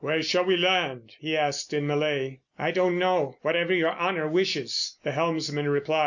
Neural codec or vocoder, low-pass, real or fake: none; 7.2 kHz; real